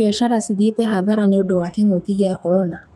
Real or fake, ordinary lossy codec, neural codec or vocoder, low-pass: fake; none; codec, 32 kHz, 1.9 kbps, SNAC; 14.4 kHz